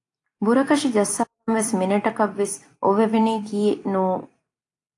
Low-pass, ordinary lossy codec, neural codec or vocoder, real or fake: 10.8 kHz; AAC, 48 kbps; vocoder, 44.1 kHz, 128 mel bands every 256 samples, BigVGAN v2; fake